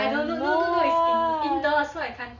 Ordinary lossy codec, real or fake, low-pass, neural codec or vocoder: none; real; 7.2 kHz; none